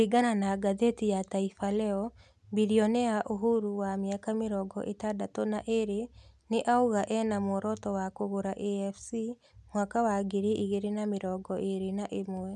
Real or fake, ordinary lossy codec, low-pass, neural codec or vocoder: real; none; none; none